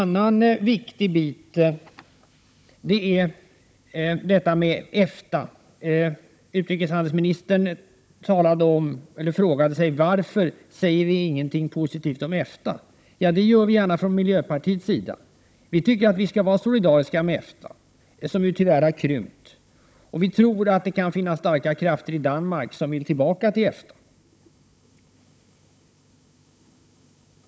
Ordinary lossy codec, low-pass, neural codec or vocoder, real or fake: none; none; codec, 16 kHz, 16 kbps, FunCodec, trained on Chinese and English, 50 frames a second; fake